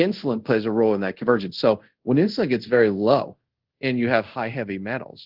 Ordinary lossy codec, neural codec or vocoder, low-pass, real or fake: Opus, 16 kbps; codec, 24 kHz, 0.5 kbps, DualCodec; 5.4 kHz; fake